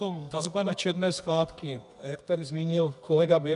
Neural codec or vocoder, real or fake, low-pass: codec, 24 kHz, 0.9 kbps, WavTokenizer, medium music audio release; fake; 10.8 kHz